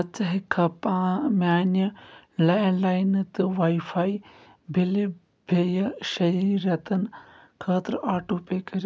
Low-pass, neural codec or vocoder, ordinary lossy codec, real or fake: none; none; none; real